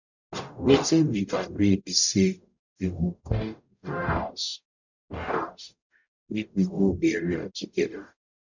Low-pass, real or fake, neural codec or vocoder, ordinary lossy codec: 7.2 kHz; fake; codec, 44.1 kHz, 0.9 kbps, DAC; none